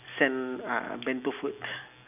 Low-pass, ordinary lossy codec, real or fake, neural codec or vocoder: 3.6 kHz; none; real; none